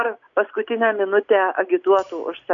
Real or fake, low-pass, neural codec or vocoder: real; 7.2 kHz; none